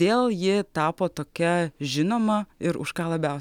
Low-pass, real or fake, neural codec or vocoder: 19.8 kHz; real; none